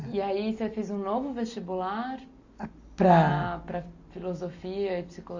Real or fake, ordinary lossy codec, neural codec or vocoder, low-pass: real; AAC, 48 kbps; none; 7.2 kHz